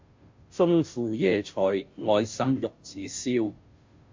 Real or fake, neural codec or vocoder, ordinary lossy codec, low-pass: fake; codec, 16 kHz, 0.5 kbps, FunCodec, trained on Chinese and English, 25 frames a second; MP3, 48 kbps; 7.2 kHz